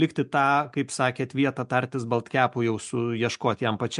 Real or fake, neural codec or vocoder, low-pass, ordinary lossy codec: real; none; 10.8 kHz; MP3, 64 kbps